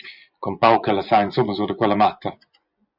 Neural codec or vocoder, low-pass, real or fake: vocoder, 24 kHz, 100 mel bands, Vocos; 5.4 kHz; fake